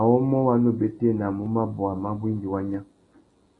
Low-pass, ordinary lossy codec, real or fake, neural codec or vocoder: 10.8 kHz; AAC, 32 kbps; real; none